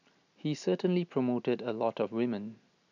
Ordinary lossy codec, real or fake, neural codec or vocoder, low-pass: none; real; none; 7.2 kHz